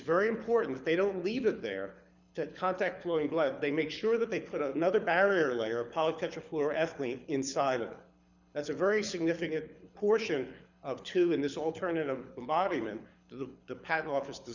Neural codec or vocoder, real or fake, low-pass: codec, 24 kHz, 6 kbps, HILCodec; fake; 7.2 kHz